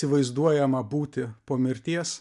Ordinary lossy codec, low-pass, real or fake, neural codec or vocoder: MP3, 96 kbps; 10.8 kHz; real; none